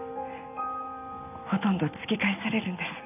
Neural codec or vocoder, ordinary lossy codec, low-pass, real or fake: none; none; 3.6 kHz; real